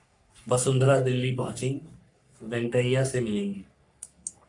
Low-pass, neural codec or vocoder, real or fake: 10.8 kHz; codec, 44.1 kHz, 3.4 kbps, Pupu-Codec; fake